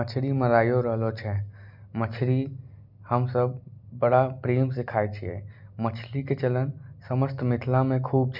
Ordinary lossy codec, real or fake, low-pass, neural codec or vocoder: none; real; 5.4 kHz; none